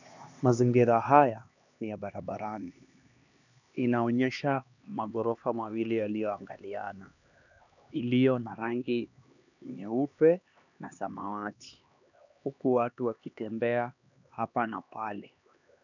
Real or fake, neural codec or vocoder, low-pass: fake; codec, 16 kHz, 2 kbps, X-Codec, HuBERT features, trained on LibriSpeech; 7.2 kHz